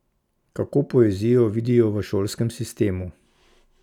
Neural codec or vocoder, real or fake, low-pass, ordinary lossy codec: none; real; 19.8 kHz; none